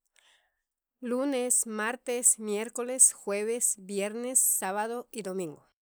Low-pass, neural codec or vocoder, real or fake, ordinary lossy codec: none; none; real; none